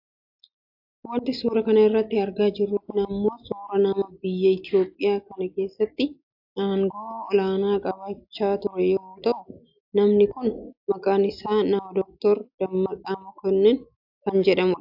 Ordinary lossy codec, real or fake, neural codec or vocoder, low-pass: AAC, 48 kbps; real; none; 5.4 kHz